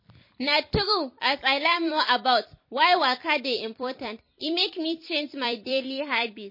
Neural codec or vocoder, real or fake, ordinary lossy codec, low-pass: vocoder, 44.1 kHz, 128 mel bands every 256 samples, BigVGAN v2; fake; MP3, 24 kbps; 5.4 kHz